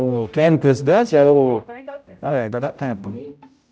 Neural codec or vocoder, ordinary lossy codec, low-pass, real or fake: codec, 16 kHz, 0.5 kbps, X-Codec, HuBERT features, trained on general audio; none; none; fake